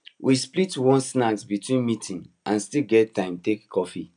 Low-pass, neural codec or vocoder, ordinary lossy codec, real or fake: 9.9 kHz; none; none; real